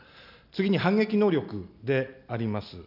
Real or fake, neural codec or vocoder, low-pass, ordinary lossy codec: real; none; 5.4 kHz; none